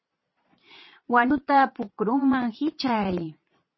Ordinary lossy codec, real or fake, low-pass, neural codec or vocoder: MP3, 24 kbps; fake; 7.2 kHz; vocoder, 22.05 kHz, 80 mel bands, Vocos